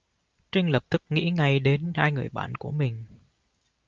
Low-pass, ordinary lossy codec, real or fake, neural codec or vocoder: 7.2 kHz; Opus, 24 kbps; real; none